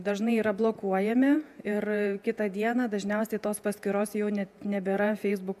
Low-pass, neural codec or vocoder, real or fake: 14.4 kHz; vocoder, 48 kHz, 128 mel bands, Vocos; fake